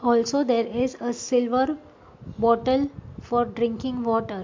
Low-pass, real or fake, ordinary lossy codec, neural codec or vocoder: 7.2 kHz; real; MP3, 48 kbps; none